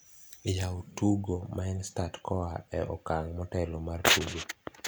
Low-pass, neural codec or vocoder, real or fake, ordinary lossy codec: none; none; real; none